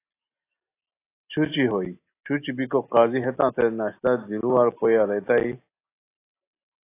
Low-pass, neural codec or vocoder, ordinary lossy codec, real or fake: 3.6 kHz; none; AAC, 24 kbps; real